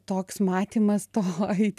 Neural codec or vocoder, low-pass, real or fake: none; 14.4 kHz; real